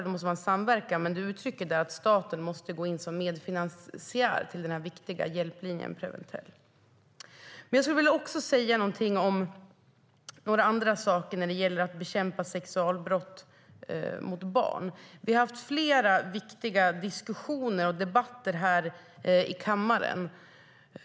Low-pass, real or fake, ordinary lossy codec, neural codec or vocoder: none; real; none; none